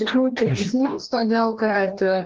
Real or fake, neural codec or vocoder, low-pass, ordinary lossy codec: fake; codec, 16 kHz, 1 kbps, FreqCodec, larger model; 7.2 kHz; Opus, 16 kbps